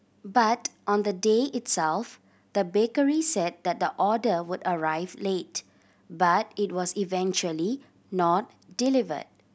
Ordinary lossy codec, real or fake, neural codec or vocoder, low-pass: none; real; none; none